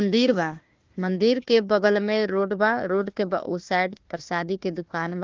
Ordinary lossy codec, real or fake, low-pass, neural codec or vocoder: Opus, 32 kbps; fake; 7.2 kHz; codec, 16 kHz, 1 kbps, FunCodec, trained on Chinese and English, 50 frames a second